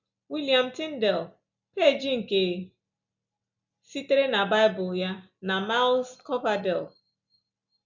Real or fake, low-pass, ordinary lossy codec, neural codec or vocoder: real; 7.2 kHz; none; none